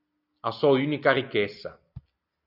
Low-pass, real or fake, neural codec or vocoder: 5.4 kHz; real; none